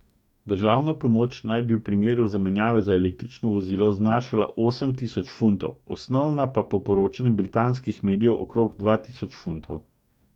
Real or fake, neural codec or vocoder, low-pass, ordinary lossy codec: fake; codec, 44.1 kHz, 2.6 kbps, DAC; 19.8 kHz; none